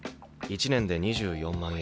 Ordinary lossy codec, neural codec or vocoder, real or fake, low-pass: none; none; real; none